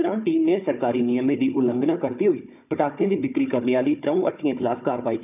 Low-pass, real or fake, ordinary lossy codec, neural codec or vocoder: 3.6 kHz; fake; none; codec, 16 kHz, 4 kbps, FunCodec, trained on Chinese and English, 50 frames a second